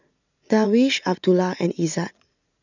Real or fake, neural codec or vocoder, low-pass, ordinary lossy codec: fake; vocoder, 44.1 kHz, 80 mel bands, Vocos; 7.2 kHz; none